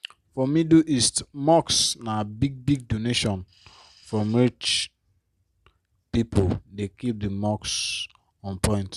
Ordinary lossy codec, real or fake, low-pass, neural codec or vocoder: Opus, 64 kbps; real; 14.4 kHz; none